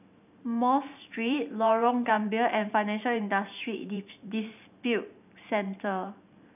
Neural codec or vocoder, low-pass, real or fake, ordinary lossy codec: vocoder, 44.1 kHz, 128 mel bands every 512 samples, BigVGAN v2; 3.6 kHz; fake; none